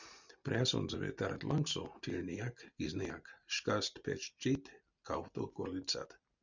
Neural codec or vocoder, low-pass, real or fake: none; 7.2 kHz; real